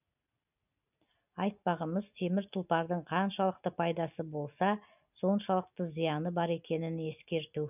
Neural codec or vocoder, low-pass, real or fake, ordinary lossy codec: none; 3.6 kHz; real; none